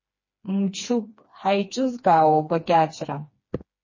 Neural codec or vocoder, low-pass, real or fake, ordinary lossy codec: codec, 16 kHz, 2 kbps, FreqCodec, smaller model; 7.2 kHz; fake; MP3, 32 kbps